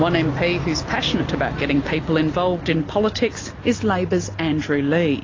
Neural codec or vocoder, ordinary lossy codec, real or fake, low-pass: none; AAC, 32 kbps; real; 7.2 kHz